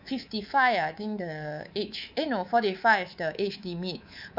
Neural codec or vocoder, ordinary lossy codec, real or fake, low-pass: codec, 24 kHz, 3.1 kbps, DualCodec; none; fake; 5.4 kHz